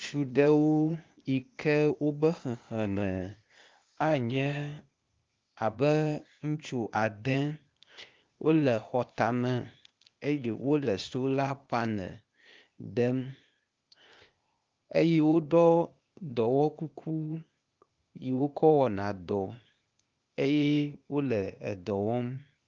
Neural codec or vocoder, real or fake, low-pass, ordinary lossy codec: codec, 16 kHz, 0.8 kbps, ZipCodec; fake; 7.2 kHz; Opus, 24 kbps